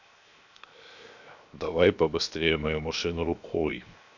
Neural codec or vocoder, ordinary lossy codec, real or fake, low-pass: codec, 16 kHz, 0.7 kbps, FocalCodec; none; fake; 7.2 kHz